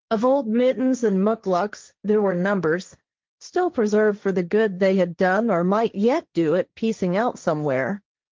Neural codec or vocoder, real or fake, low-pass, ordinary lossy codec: codec, 16 kHz, 1.1 kbps, Voila-Tokenizer; fake; 7.2 kHz; Opus, 32 kbps